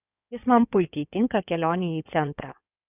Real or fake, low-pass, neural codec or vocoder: fake; 3.6 kHz; codec, 16 kHz in and 24 kHz out, 2.2 kbps, FireRedTTS-2 codec